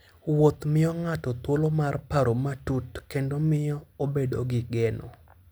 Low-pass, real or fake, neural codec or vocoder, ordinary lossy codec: none; real; none; none